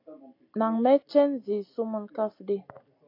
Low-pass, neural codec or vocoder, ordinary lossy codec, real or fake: 5.4 kHz; none; AAC, 32 kbps; real